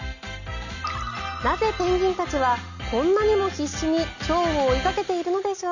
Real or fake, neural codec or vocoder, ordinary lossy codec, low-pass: real; none; none; 7.2 kHz